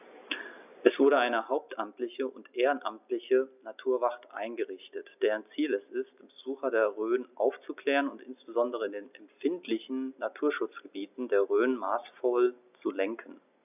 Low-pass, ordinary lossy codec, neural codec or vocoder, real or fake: 3.6 kHz; none; none; real